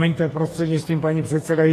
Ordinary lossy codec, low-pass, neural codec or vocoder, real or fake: AAC, 48 kbps; 14.4 kHz; codec, 44.1 kHz, 2.6 kbps, DAC; fake